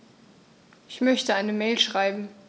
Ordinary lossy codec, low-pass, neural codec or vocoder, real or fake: none; none; none; real